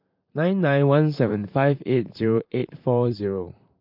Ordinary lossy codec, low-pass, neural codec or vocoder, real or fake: MP3, 48 kbps; 5.4 kHz; vocoder, 44.1 kHz, 128 mel bands, Pupu-Vocoder; fake